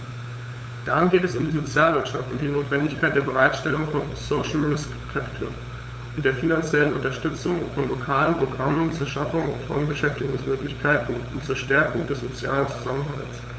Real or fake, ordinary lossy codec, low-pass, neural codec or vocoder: fake; none; none; codec, 16 kHz, 8 kbps, FunCodec, trained on LibriTTS, 25 frames a second